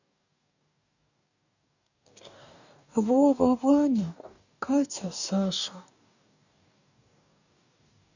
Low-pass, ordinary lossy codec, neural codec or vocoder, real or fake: 7.2 kHz; none; codec, 44.1 kHz, 2.6 kbps, DAC; fake